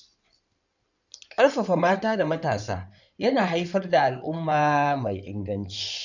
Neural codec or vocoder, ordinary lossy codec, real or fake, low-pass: codec, 16 kHz in and 24 kHz out, 2.2 kbps, FireRedTTS-2 codec; none; fake; 7.2 kHz